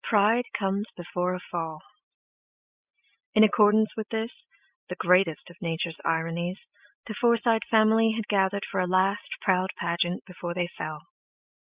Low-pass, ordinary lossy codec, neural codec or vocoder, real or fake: 3.6 kHz; Opus, 64 kbps; none; real